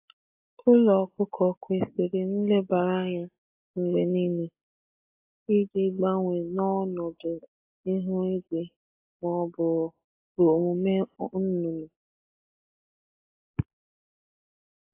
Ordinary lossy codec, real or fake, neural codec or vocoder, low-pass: AAC, 24 kbps; real; none; 3.6 kHz